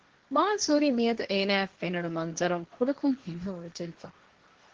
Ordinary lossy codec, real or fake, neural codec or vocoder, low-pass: Opus, 16 kbps; fake; codec, 16 kHz, 1.1 kbps, Voila-Tokenizer; 7.2 kHz